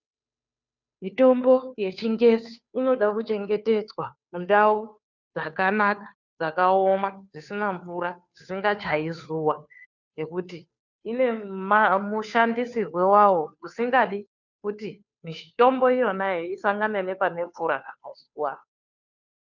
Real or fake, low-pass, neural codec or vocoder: fake; 7.2 kHz; codec, 16 kHz, 2 kbps, FunCodec, trained on Chinese and English, 25 frames a second